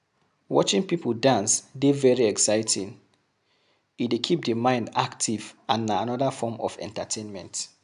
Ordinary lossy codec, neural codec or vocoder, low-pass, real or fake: none; none; 10.8 kHz; real